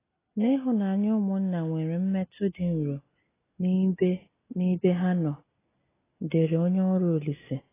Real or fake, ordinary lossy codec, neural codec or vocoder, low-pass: real; AAC, 16 kbps; none; 3.6 kHz